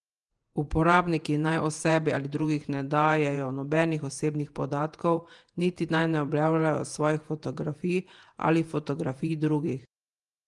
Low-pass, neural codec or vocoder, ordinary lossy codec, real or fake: 10.8 kHz; vocoder, 48 kHz, 128 mel bands, Vocos; Opus, 24 kbps; fake